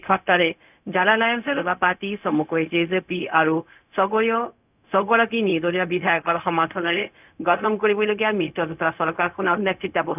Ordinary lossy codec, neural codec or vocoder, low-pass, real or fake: none; codec, 16 kHz, 0.4 kbps, LongCat-Audio-Codec; 3.6 kHz; fake